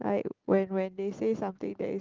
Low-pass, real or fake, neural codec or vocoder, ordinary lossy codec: 7.2 kHz; real; none; Opus, 16 kbps